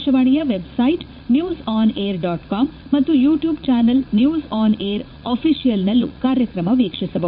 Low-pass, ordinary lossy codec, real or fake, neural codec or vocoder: 5.4 kHz; none; fake; vocoder, 44.1 kHz, 80 mel bands, Vocos